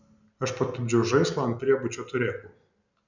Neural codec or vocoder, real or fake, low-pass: none; real; 7.2 kHz